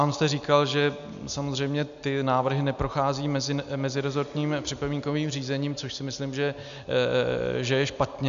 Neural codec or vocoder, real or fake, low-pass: none; real; 7.2 kHz